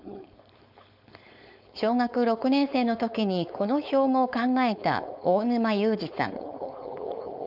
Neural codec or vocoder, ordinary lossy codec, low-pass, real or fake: codec, 16 kHz, 4.8 kbps, FACodec; none; 5.4 kHz; fake